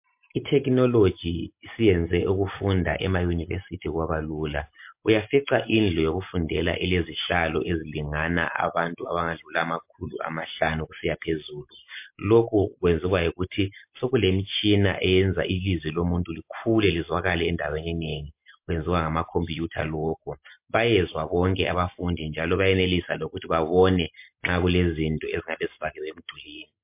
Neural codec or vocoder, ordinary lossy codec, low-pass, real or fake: none; MP3, 32 kbps; 3.6 kHz; real